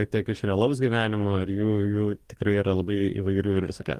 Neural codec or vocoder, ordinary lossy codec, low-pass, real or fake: codec, 44.1 kHz, 2.6 kbps, DAC; Opus, 32 kbps; 14.4 kHz; fake